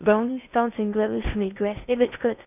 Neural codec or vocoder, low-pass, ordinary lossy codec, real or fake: codec, 16 kHz in and 24 kHz out, 0.6 kbps, FocalCodec, streaming, 2048 codes; 3.6 kHz; none; fake